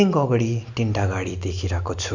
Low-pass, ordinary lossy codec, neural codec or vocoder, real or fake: 7.2 kHz; none; none; real